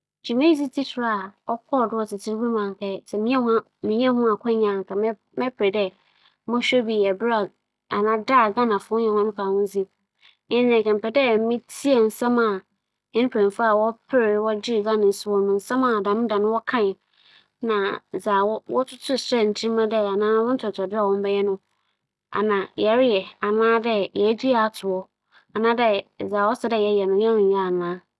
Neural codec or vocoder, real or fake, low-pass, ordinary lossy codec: none; real; none; none